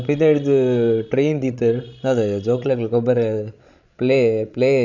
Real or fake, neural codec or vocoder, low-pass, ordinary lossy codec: fake; codec, 16 kHz, 16 kbps, FreqCodec, larger model; 7.2 kHz; none